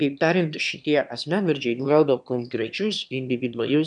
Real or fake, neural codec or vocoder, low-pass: fake; autoencoder, 22.05 kHz, a latent of 192 numbers a frame, VITS, trained on one speaker; 9.9 kHz